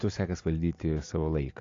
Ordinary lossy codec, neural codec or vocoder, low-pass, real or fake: MP3, 48 kbps; none; 7.2 kHz; real